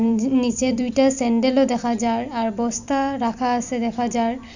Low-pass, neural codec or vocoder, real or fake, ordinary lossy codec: 7.2 kHz; vocoder, 44.1 kHz, 128 mel bands every 256 samples, BigVGAN v2; fake; none